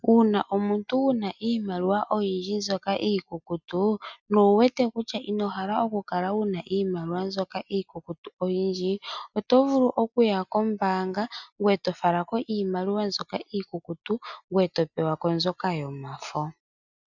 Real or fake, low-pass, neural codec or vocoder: real; 7.2 kHz; none